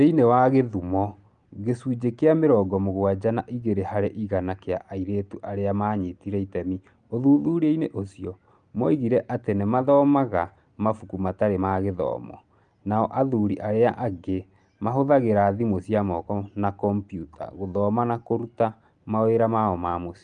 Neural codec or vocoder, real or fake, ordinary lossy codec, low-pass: none; real; Opus, 32 kbps; 9.9 kHz